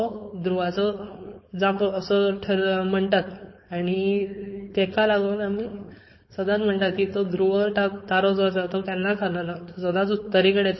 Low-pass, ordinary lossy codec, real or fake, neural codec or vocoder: 7.2 kHz; MP3, 24 kbps; fake; codec, 16 kHz, 4.8 kbps, FACodec